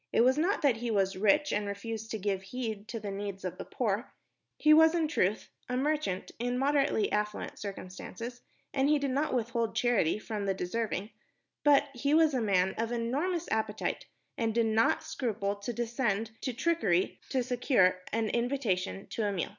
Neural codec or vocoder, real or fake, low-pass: none; real; 7.2 kHz